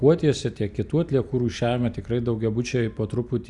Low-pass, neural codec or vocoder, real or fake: 10.8 kHz; vocoder, 44.1 kHz, 128 mel bands every 256 samples, BigVGAN v2; fake